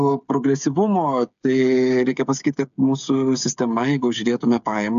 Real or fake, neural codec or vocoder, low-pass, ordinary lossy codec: fake; codec, 16 kHz, 8 kbps, FreqCodec, smaller model; 7.2 kHz; AAC, 96 kbps